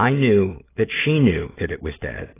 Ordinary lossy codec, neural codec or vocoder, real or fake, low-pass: AAC, 16 kbps; none; real; 3.6 kHz